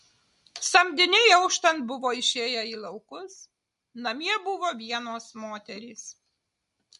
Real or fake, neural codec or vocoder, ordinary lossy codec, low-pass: real; none; MP3, 48 kbps; 14.4 kHz